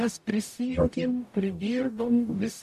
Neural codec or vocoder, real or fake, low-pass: codec, 44.1 kHz, 0.9 kbps, DAC; fake; 14.4 kHz